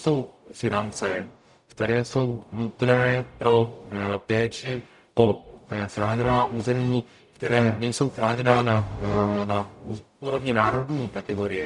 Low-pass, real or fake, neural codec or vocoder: 10.8 kHz; fake; codec, 44.1 kHz, 0.9 kbps, DAC